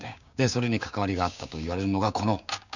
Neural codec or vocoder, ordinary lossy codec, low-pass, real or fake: codec, 16 kHz, 6 kbps, DAC; none; 7.2 kHz; fake